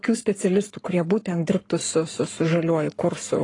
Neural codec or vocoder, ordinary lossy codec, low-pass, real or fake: codec, 44.1 kHz, 7.8 kbps, Pupu-Codec; AAC, 32 kbps; 10.8 kHz; fake